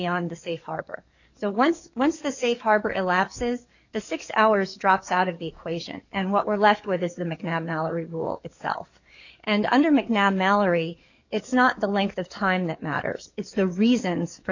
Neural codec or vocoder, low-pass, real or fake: codec, 44.1 kHz, 7.8 kbps, DAC; 7.2 kHz; fake